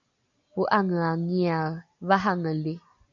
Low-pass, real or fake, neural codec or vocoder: 7.2 kHz; real; none